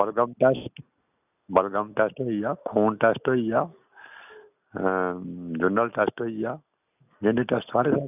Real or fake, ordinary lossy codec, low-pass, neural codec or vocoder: real; none; 3.6 kHz; none